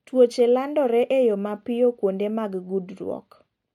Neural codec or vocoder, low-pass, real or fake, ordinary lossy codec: none; 19.8 kHz; real; MP3, 64 kbps